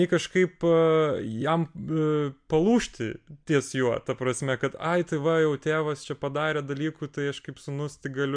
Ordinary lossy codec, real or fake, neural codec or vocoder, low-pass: MP3, 64 kbps; real; none; 9.9 kHz